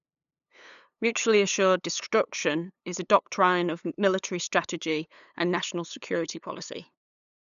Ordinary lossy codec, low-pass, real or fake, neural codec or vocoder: none; 7.2 kHz; fake; codec, 16 kHz, 8 kbps, FunCodec, trained on LibriTTS, 25 frames a second